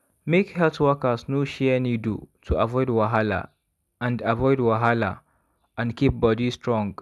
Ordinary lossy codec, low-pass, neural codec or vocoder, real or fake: none; none; none; real